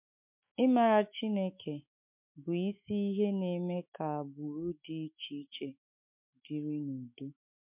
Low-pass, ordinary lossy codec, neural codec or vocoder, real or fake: 3.6 kHz; MP3, 32 kbps; autoencoder, 48 kHz, 128 numbers a frame, DAC-VAE, trained on Japanese speech; fake